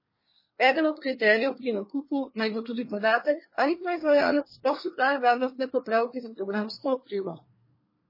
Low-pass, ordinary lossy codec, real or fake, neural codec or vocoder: 5.4 kHz; MP3, 24 kbps; fake; codec, 24 kHz, 1 kbps, SNAC